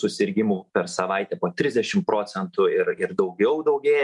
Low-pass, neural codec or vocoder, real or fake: 10.8 kHz; none; real